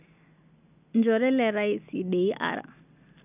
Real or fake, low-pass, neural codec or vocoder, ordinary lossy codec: real; 3.6 kHz; none; none